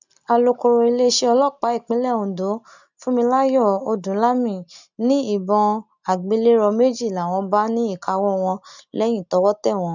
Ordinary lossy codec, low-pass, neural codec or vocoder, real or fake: none; 7.2 kHz; none; real